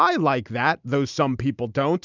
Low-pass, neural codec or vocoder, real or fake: 7.2 kHz; none; real